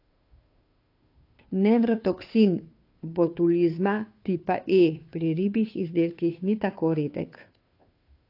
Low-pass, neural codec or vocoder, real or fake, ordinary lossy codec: 5.4 kHz; codec, 16 kHz, 2 kbps, FunCodec, trained on Chinese and English, 25 frames a second; fake; AAC, 32 kbps